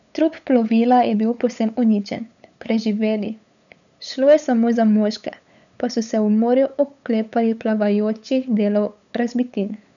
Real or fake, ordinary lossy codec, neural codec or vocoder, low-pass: fake; none; codec, 16 kHz, 8 kbps, FunCodec, trained on LibriTTS, 25 frames a second; 7.2 kHz